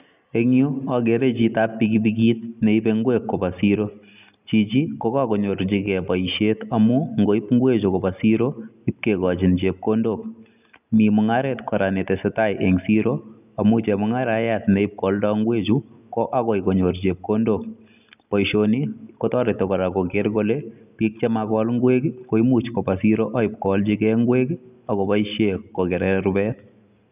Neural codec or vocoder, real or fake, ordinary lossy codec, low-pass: none; real; none; 3.6 kHz